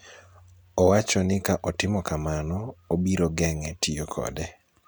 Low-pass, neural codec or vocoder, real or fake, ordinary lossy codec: none; none; real; none